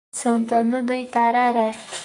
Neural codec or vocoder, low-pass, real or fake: codec, 44.1 kHz, 2.6 kbps, SNAC; 10.8 kHz; fake